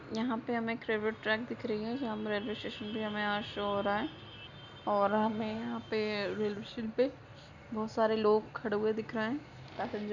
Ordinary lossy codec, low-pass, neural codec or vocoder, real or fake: none; 7.2 kHz; none; real